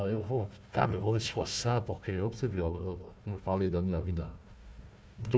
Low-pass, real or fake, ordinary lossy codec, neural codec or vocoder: none; fake; none; codec, 16 kHz, 1 kbps, FunCodec, trained on Chinese and English, 50 frames a second